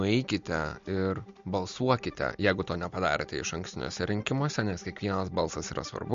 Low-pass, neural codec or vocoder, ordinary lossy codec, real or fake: 7.2 kHz; none; MP3, 48 kbps; real